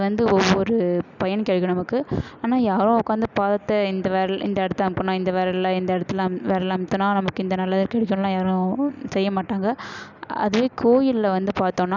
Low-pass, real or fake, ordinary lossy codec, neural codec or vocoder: 7.2 kHz; real; none; none